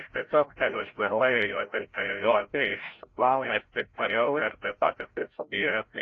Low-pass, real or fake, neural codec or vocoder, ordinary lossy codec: 7.2 kHz; fake; codec, 16 kHz, 0.5 kbps, FreqCodec, larger model; AAC, 32 kbps